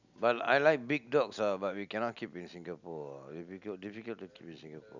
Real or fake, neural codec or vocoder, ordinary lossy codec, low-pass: real; none; none; 7.2 kHz